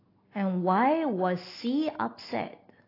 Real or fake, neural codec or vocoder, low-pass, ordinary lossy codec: real; none; 5.4 kHz; AAC, 24 kbps